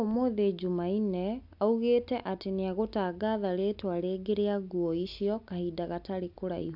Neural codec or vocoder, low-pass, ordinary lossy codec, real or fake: none; 5.4 kHz; AAC, 48 kbps; real